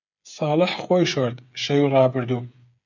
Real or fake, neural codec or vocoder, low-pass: fake; codec, 16 kHz, 8 kbps, FreqCodec, smaller model; 7.2 kHz